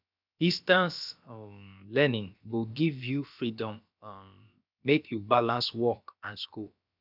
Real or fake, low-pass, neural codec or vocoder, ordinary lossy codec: fake; 5.4 kHz; codec, 16 kHz, about 1 kbps, DyCAST, with the encoder's durations; none